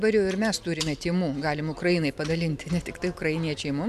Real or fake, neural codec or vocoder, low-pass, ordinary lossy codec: fake; vocoder, 44.1 kHz, 128 mel bands every 256 samples, BigVGAN v2; 14.4 kHz; MP3, 96 kbps